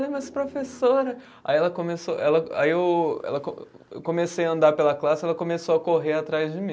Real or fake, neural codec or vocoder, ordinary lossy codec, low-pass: real; none; none; none